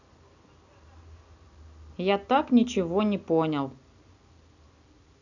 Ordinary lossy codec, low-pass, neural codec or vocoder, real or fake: none; 7.2 kHz; none; real